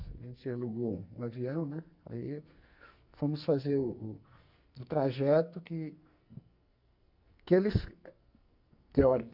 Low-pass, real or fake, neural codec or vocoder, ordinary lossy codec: 5.4 kHz; fake; codec, 44.1 kHz, 2.6 kbps, SNAC; Opus, 64 kbps